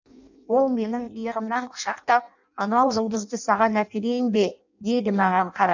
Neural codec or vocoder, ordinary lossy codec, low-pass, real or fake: codec, 16 kHz in and 24 kHz out, 0.6 kbps, FireRedTTS-2 codec; none; 7.2 kHz; fake